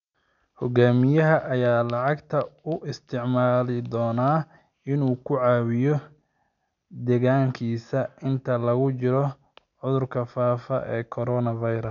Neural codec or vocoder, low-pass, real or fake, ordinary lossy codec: none; 7.2 kHz; real; none